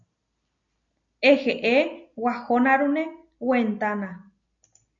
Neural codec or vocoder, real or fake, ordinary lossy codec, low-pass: none; real; AAC, 64 kbps; 7.2 kHz